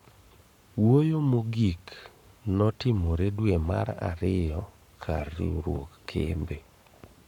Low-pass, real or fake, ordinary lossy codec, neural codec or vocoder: 19.8 kHz; fake; none; codec, 44.1 kHz, 7.8 kbps, Pupu-Codec